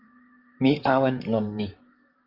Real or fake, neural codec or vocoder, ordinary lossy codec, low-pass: fake; vocoder, 44.1 kHz, 128 mel bands, Pupu-Vocoder; Opus, 24 kbps; 5.4 kHz